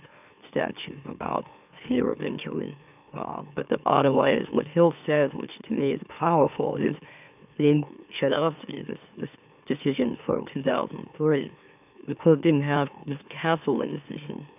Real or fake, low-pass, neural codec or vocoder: fake; 3.6 kHz; autoencoder, 44.1 kHz, a latent of 192 numbers a frame, MeloTTS